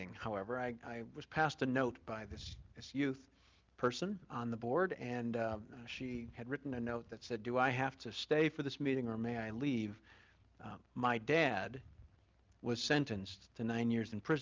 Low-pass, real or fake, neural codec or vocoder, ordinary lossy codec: 7.2 kHz; real; none; Opus, 24 kbps